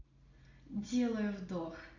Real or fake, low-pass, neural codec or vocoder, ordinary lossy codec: real; 7.2 kHz; none; none